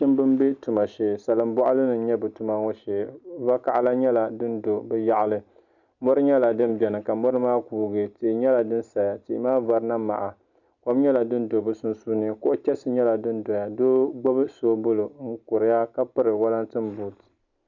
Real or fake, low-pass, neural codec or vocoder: real; 7.2 kHz; none